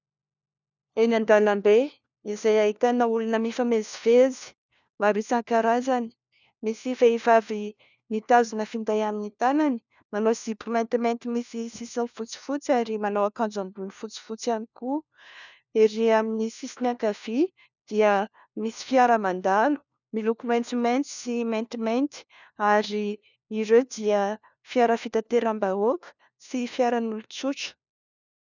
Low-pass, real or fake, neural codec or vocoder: 7.2 kHz; fake; codec, 16 kHz, 1 kbps, FunCodec, trained on LibriTTS, 50 frames a second